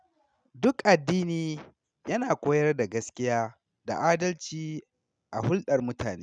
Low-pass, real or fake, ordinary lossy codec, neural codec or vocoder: 9.9 kHz; real; none; none